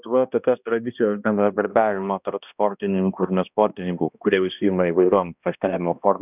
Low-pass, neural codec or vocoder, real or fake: 3.6 kHz; codec, 16 kHz, 1 kbps, X-Codec, HuBERT features, trained on balanced general audio; fake